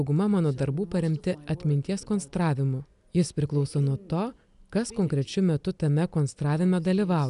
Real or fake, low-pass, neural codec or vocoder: real; 10.8 kHz; none